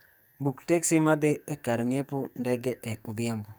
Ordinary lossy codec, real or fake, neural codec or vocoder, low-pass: none; fake; codec, 44.1 kHz, 2.6 kbps, SNAC; none